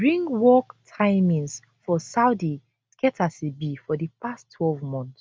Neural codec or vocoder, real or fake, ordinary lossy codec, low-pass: none; real; none; none